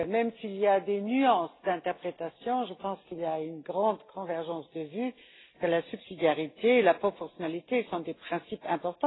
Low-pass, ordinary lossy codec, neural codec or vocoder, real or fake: 7.2 kHz; AAC, 16 kbps; none; real